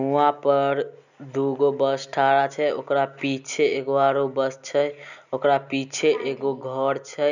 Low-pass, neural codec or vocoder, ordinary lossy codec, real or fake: 7.2 kHz; none; none; real